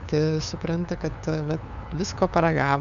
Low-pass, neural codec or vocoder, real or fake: 7.2 kHz; codec, 16 kHz, 2 kbps, FunCodec, trained on LibriTTS, 25 frames a second; fake